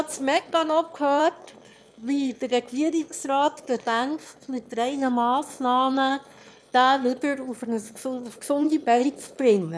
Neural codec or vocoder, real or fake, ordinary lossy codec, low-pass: autoencoder, 22.05 kHz, a latent of 192 numbers a frame, VITS, trained on one speaker; fake; none; none